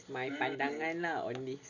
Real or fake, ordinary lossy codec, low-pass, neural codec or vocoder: real; none; 7.2 kHz; none